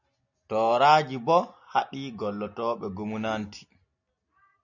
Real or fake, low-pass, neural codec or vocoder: real; 7.2 kHz; none